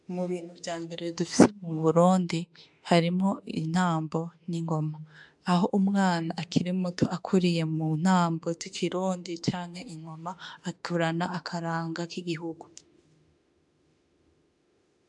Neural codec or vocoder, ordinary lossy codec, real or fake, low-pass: autoencoder, 48 kHz, 32 numbers a frame, DAC-VAE, trained on Japanese speech; MP3, 96 kbps; fake; 10.8 kHz